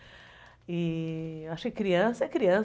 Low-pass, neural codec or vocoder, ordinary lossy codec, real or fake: none; none; none; real